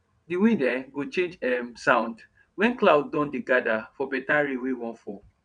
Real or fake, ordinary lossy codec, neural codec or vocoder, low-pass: fake; none; vocoder, 22.05 kHz, 80 mel bands, WaveNeXt; 9.9 kHz